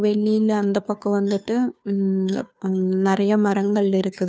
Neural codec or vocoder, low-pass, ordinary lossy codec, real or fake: codec, 16 kHz, 2 kbps, FunCodec, trained on Chinese and English, 25 frames a second; none; none; fake